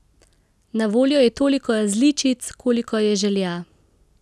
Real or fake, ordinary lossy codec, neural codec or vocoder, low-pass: real; none; none; none